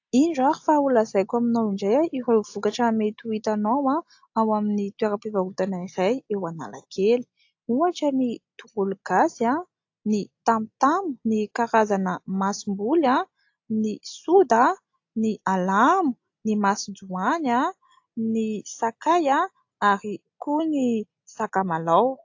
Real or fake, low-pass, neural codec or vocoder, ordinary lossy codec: real; 7.2 kHz; none; AAC, 48 kbps